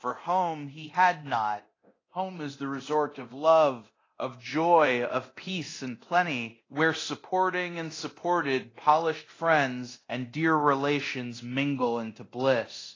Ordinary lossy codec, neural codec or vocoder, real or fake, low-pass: AAC, 32 kbps; codec, 24 kHz, 0.9 kbps, DualCodec; fake; 7.2 kHz